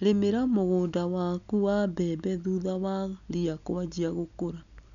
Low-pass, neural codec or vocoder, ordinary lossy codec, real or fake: 7.2 kHz; none; none; real